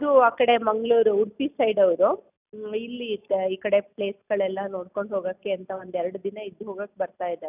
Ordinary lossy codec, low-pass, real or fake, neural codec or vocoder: none; 3.6 kHz; real; none